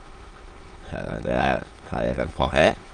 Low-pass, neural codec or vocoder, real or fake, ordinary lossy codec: 9.9 kHz; autoencoder, 22.05 kHz, a latent of 192 numbers a frame, VITS, trained on many speakers; fake; Opus, 24 kbps